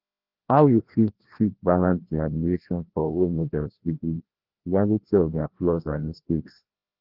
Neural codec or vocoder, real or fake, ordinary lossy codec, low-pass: codec, 16 kHz, 1 kbps, FreqCodec, larger model; fake; Opus, 16 kbps; 5.4 kHz